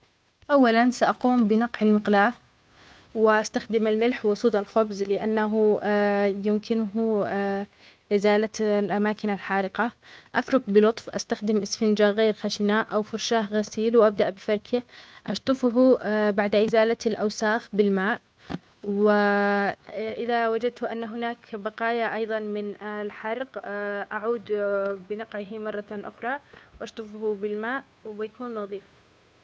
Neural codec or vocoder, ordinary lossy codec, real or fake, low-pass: codec, 16 kHz, 2 kbps, FunCodec, trained on Chinese and English, 25 frames a second; none; fake; none